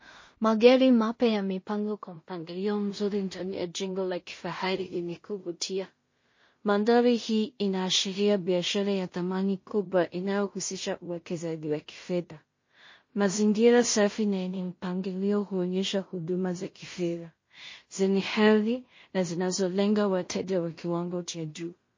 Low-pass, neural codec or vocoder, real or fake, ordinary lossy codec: 7.2 kHz; codec, 16 kHz in and 24 kHz out, 0.4 kbps, LongCat-Audio-Codec, two codebook decoder; fake; MP3, 32 kbps